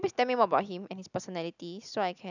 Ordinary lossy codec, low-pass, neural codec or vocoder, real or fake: none; 7.2 kHz; none; real